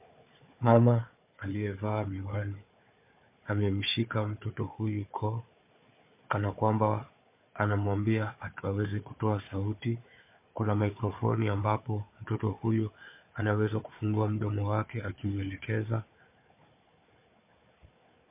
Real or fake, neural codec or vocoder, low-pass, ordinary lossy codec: fake; codec, 16 kHz, 4 kbps, FunCodec, trained on Chinese and English, 50 frames a second; 3.6 kHz; MP3, 24 kbps